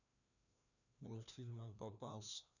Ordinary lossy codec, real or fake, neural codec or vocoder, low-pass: none; fake; codec, 16 kHz, 1 kbps, FreqCodec, larger model; 7.2 kHz